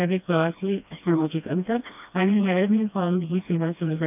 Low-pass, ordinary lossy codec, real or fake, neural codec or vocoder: 3.6 kHz; none; fake; codec, 16 kHz, 1 kbps, FreqCodec, smaller model